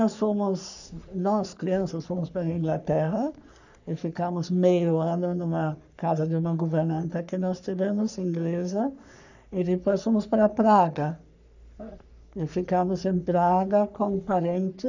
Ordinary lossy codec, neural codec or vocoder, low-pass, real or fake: none; codec, 44.1 kHz, 3.4 kbps, Pupu-Codec; 7.2 kHz; fake